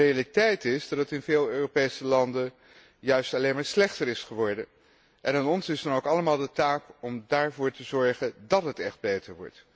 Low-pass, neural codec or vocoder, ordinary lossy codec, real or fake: none; none; none; real